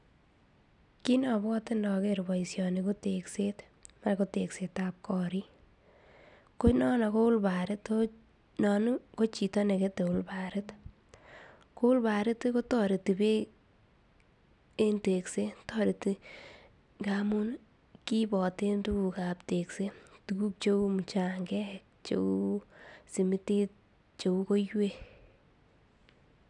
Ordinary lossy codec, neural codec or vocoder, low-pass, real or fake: none; none; 10.8 kHz; real